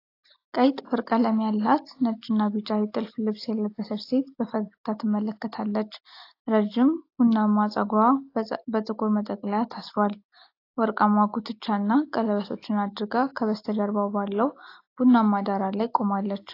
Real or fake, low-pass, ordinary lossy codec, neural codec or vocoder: real; 5.4 kHz; AAC, 32 kbps; none